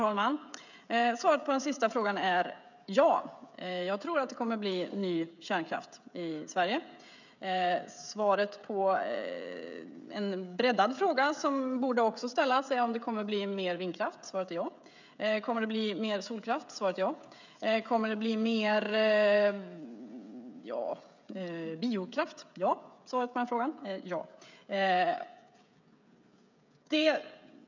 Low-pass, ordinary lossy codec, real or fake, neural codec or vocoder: 7.2 kHz; none; fake; codec, 16 kHz, 16 kbps, FreqCodec, smaller model